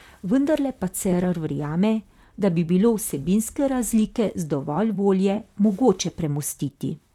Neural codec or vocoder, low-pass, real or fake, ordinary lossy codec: vocoder, 44.1 kHz, 128 mel bands, Pupu-Vocoder; 19.8 kHz; fake; none